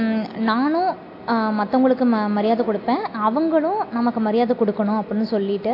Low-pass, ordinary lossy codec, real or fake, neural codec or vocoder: 5.4 kHz; AAC, 32 kbps; real; none